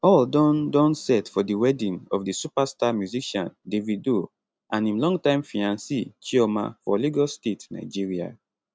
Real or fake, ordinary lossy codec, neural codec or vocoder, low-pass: real; none; none; none